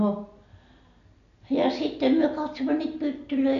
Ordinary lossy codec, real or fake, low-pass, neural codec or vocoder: none; real; 7.2 kHz; none